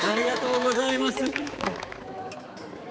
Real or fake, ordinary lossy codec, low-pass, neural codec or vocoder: fake; none; none; codec, 16 kHz, 4 kbps, X-Codec, HuBERT features, trained on balanced general audio